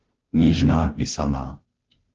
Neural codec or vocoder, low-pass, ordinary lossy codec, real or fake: codec, 16 kHz, 0.5 kbps, FunCodec, trained on Chinese and English, 25 frames a second; 7.2 kHz; Opus, 16 kbps; fake